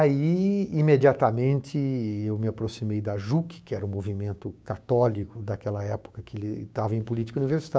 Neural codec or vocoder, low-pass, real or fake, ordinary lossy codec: codec, 16 kHz, 6 kbps, DAC; none; fake; none